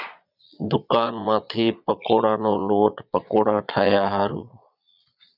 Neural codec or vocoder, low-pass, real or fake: vocoder, 22.05 kHz, 80 mel bands, Vocos; 5.4 kHz; fake